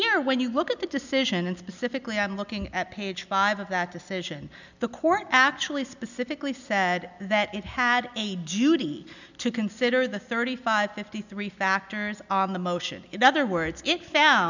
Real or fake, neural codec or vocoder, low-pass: real; none; 7.2 kHz